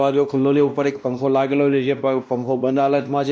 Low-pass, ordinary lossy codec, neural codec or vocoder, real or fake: none; none; codec, 16 kHz, 1 kbps, X-Codec, WavLM features, trained on Multilingual LibriSpeech; fake